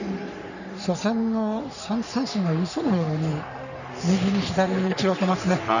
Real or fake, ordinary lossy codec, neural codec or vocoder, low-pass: fake; none; codec, 44.1 kHz, 3.4 kbps, Pupu-Codec; 7.2 kHz